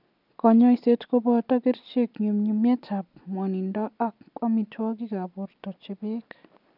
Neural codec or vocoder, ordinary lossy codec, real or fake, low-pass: none; none; real; 5.4 kHz